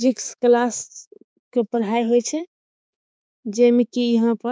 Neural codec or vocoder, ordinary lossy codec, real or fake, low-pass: codec, 16 kHz, 4 kbps, X-Codec, HuBERT features, trained on balanced general audio; none; fake; none